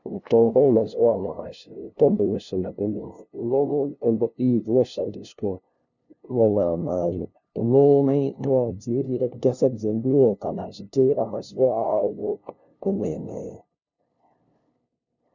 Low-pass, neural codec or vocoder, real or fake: 7.2 kHz; codec, 16 kHz, 0.5 kbps, FunCodec, trained on LibriTTS, 25 frames a second; fake